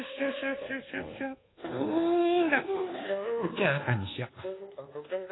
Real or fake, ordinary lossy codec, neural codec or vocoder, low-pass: fake; AAC, 16 kbps; codec, 24 kHz, 1.2 kbps, DualCodec; 7.2 kHz